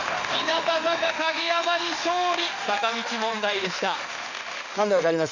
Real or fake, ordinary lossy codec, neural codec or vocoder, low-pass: fake; none; autoencoder, 48 kHz, 32 numbers a frame, DAC-VAE, trained on Japanese speech; 7.2 kHz